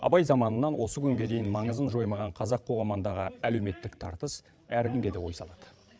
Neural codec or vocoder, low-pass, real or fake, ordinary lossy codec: codec, 16 kHz, 8 kbps, FreqCodec, larger model; none; fake; none